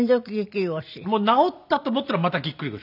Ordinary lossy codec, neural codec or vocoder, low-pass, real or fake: none; none; 5.4 kHz; real